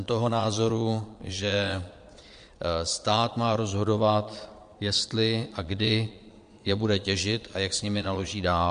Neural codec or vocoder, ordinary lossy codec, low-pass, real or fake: vocoder, 22.05 kHz, 80 mel bands, Vocos; MP3, 64 kbps; 9.9 kHz; fake